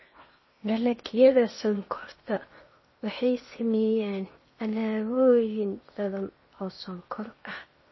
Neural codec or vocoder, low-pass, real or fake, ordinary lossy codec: codec, 16 kHz in and 24 kHz out, 0.8 kbps, FocalCodec, streaming, 65536 codes; 7.2 kHz; fake; MP3, 24 kbps